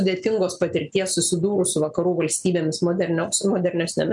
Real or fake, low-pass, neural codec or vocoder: real; 10.8 kHz; none